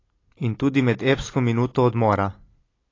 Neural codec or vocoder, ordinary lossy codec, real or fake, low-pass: none; AAC, 32 kbps; real; 7.2 kHz